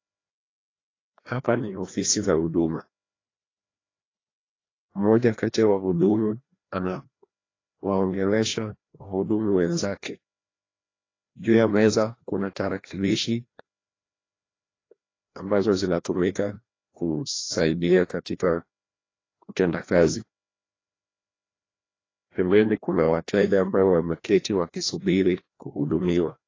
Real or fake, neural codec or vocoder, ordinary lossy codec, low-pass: fake; codec, 16 kHz, 1 kbps, FreqCodec, larger model; AAC, 32 kbps; 7.2 kHz